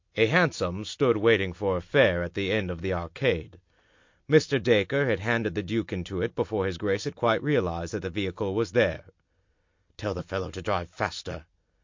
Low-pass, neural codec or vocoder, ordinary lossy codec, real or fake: 7.2 kHz; none; MP3, 48 kbps; real